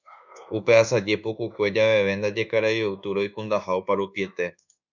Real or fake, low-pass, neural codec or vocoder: fake; 7.2 kHz; codec, 24 kHz, 1.2 kbps, DualCodec